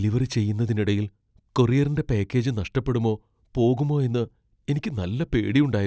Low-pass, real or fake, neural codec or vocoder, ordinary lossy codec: none; real; none; none